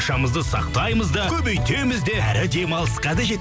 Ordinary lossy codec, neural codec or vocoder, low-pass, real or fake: none; none; none; real